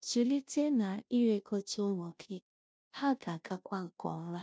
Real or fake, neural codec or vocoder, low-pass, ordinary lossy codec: fake; codec, 16 kHz, 0.5 kbps, FunCodec, trained on Chinese and English, 25 frames a second; none; none